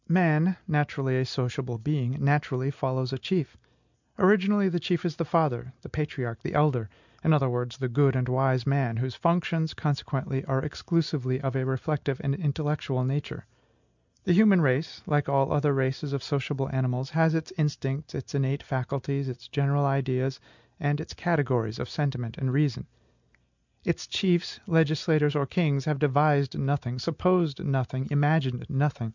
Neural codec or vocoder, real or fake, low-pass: none; real; 7.2 kHz